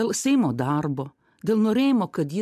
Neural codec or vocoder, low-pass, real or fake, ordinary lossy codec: none; 14.4 kHz; real; MP3, 96 kbps